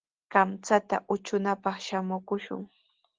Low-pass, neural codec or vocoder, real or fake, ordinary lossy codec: 7.2 kHz; none; real; Opus, 16 kbps